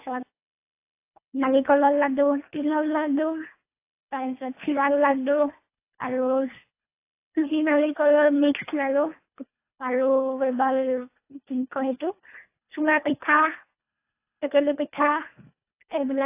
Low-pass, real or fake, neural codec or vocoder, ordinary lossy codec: 3.6 kHz; fake; codec, 24 kHz, 1.5 kbps, HILCodec; AAC, 24 kbps